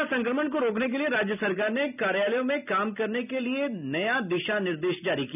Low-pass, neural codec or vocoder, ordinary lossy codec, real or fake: 3.6 kHz; none; none; real